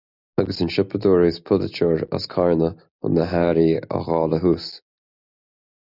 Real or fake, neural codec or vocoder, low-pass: real; none; 5.4 kHz